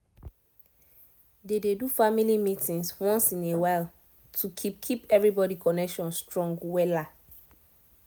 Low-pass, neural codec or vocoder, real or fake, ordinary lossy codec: none; none; real; none